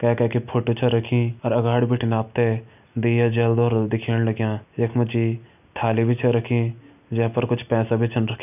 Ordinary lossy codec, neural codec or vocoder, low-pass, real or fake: none; none; 3.6 kHz; real